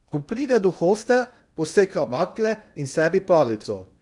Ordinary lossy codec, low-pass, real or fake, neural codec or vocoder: none; 10.8 kHz; fake; codec, 16 kHz in and 24 kHz out, 0.8 kbps, FocalCodec, streaming, 65536 codes